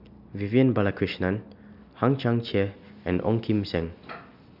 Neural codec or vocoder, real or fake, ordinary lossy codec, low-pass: none; real; none; 5.4 kHz